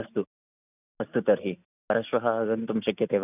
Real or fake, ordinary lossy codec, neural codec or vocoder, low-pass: real; none; none; 3.6 kHz